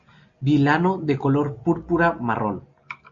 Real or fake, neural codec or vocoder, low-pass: real; none; 7.2 kHz